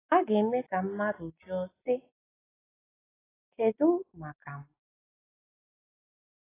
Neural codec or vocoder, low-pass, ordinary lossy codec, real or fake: none; 3.6 kHz; AAC, 16 kbps; real